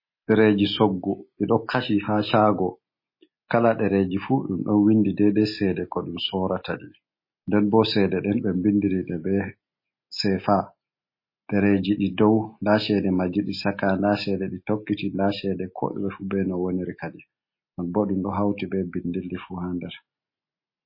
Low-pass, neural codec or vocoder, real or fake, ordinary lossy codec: 5.4 kHz; none; real; MP3, 24 kbps